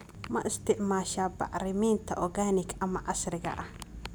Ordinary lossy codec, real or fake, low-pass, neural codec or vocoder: none; real; none; none